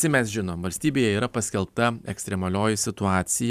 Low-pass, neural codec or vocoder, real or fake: 14.4 kHz; vocoder, 44.1 kHz, 128 mel bands every 512 samples, BigVGAN v2; fake